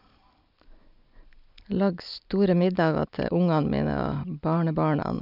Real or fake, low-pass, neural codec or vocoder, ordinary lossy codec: real; 5.4 kHz; none; none